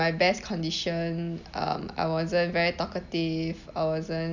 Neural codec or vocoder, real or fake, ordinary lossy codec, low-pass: none; real; none; 7.2 kHz